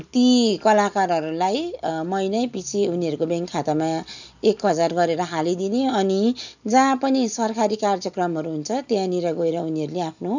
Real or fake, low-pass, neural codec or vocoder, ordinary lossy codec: real; 7.2 kHz; none; none